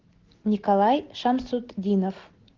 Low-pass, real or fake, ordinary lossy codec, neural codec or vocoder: 7.2 kHz; real; Opus, 16 kbps; none